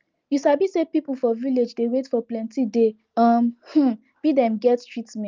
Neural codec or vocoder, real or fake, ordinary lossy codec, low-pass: none; real; Opus, 32 kbps; 7.2 kHz